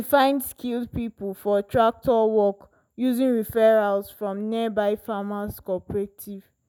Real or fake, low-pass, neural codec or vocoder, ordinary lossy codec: real; none; none; none